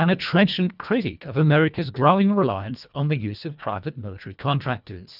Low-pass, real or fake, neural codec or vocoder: 5.4 kHz; fake; codec, 24 kHz, 1.5 kbps, HILCodec